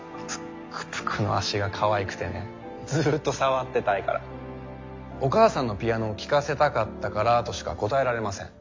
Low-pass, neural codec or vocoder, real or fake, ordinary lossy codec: 7.2 kHz; none; real; MP3, 48 kbps